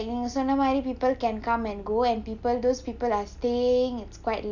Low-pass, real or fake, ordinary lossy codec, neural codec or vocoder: 7.2 kHz; real; none; none